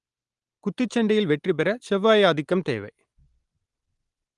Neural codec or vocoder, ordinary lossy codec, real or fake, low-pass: none; Opus, 24 kbps; real; 10.8 kHz